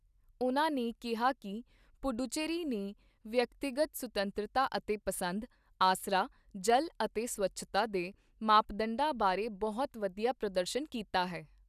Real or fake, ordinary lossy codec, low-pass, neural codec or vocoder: real; none; 14.4 kHz; none